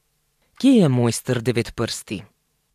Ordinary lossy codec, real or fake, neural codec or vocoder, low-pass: AAC, 96 kbps; fake; vocoder, 44.1 kHz, 128 mel bands every 512 samples, BigVGAN v2; 14.4 kHz